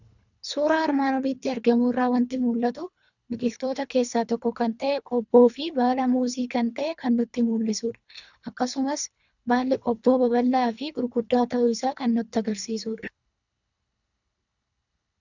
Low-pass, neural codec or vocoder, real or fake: 7.2 kHz; codec, 24 kHz, 3 kbps, HILCodec; fake